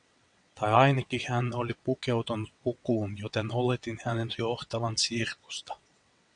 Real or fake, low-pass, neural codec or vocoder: fake; 9.9 kHz; vocoder, 22.05 kHz, 80 mel bands, WaveNeXt